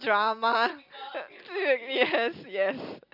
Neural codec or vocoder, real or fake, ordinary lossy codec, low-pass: none; real; none; 5.4 kHz